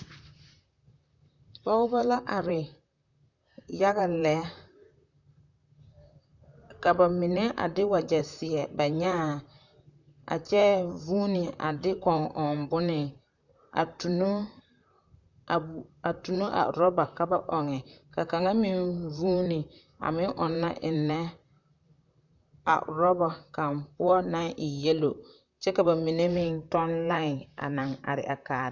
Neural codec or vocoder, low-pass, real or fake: vocoder, 44.1 kHz, 128 mel bands, Pupu-Vocoder; 7.2 kHz; fake